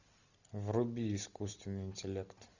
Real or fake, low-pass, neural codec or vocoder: real; 7.2 kHz; none